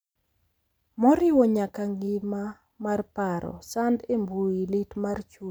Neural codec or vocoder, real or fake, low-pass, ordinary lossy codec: none; real; none; none